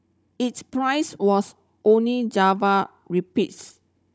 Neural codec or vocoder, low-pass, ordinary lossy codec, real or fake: codec, 16 kHz, 16 kbps, FunCodec, trained on Chinese and English, 50 frames a second; none; none; fake